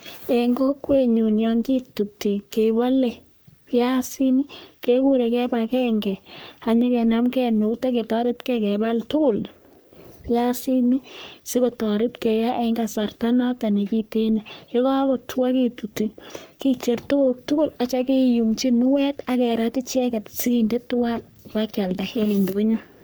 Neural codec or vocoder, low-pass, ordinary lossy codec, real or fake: codec, 44.1 kHz, 3.4 kbps, Pupu-Codec; none; none; fake